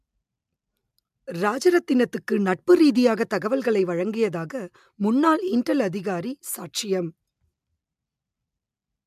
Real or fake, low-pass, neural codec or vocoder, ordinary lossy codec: real; 14.4 kHz; none; MP3, 96 kbps